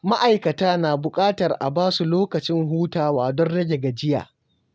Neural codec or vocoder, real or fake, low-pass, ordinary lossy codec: none; real; none; none